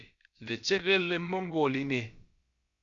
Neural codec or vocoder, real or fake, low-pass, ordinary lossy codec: codec, 16 kHz, about 1 kbps, DyCAST, with the encoder's durations; fake; 7.2 kHz; Opus, 64 kbps